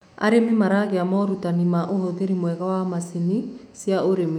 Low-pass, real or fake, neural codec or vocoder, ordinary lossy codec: 19.8 kHz; fake; autoencoder, 48 kHz, 128 numbers a frame, DAC-VAE, trained on Japanese speech; none